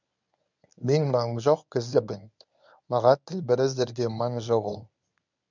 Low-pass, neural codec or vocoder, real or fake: 7.2 kHz; codec, 24 kHz, 0.9 kbps, WavTokenizer, medium speech release version 1; fake